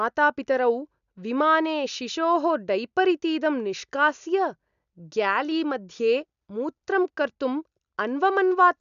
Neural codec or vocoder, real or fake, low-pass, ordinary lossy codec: none; real; 7.2 kHz; none